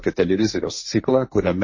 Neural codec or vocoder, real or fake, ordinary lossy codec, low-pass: codec, 16 kHz, 1.1 kbps, Voila-Tokenizer; fake; MP3, 32 kbps; 7.2 kHz